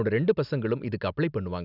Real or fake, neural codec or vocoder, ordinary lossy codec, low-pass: real; none; none; 5.4 kHz